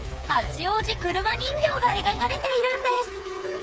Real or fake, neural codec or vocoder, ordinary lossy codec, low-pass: fake; codec, 16 kHz, 4 kbps, FreqCodec, smaller model; none; none